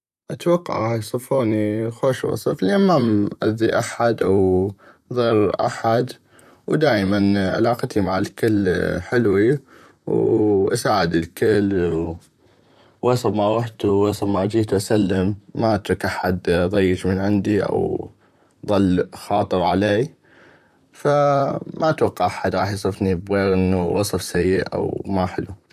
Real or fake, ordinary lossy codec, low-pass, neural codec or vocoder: fake; none; 14.4 kHz; vocoder, 44.1 kHz, 128 mel bands, Pupu-Vocoder